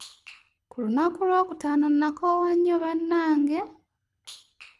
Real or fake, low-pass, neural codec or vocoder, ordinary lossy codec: fake; none; codec, 24 kHz, 6 kbps, HILCodec; none